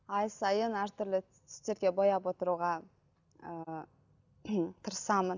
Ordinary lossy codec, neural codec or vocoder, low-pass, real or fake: none; none; 7.2 kHz; real